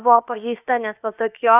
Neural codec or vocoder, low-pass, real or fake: codec, 16 kHz, about 1 kbps, DyCAST, with the encoder's durations; 3.6 kHz; fake